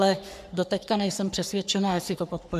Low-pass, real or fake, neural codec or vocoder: 14.4 kHz; fake; codec, 44.1 kHz, 3.4 kbps, Pupu-Codec